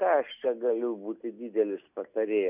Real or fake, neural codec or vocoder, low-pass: real; none; 3.6 kHz